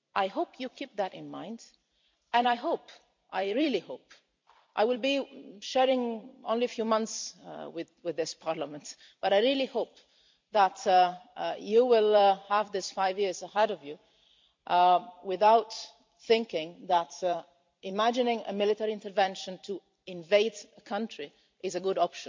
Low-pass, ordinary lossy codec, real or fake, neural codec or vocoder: 7.2 kHz; MP3, 64 kbps; fake; vocoder, 44.1 kHz, 128 mel bands every 256 samples, BigVGAN v2